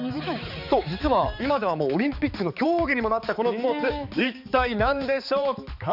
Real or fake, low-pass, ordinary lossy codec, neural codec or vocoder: fake; 5.4 kHz; none; codec, 16 kHz, 4 kbps, X-Codec, HuBERT features, trained on balanced general audio